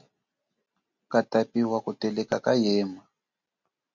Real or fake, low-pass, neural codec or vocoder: real; 7.2 kHz; none